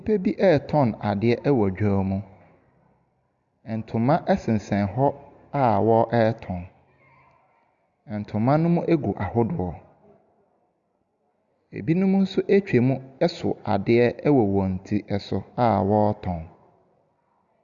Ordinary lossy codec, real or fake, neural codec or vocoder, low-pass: Opus, 64 kbps; real; none; 7.2 kHz